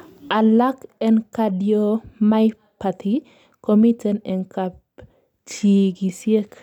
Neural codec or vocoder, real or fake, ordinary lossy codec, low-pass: none; real; none; 19.8 kHz